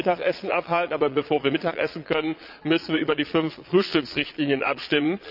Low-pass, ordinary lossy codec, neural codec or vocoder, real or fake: 5.4 kHz; none; vocoder, 22.05 kHz, 80 mel bands, Vocos; fake